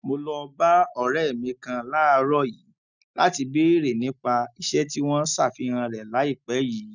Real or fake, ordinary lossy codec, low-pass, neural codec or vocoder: real; none; 7.2 kHz; none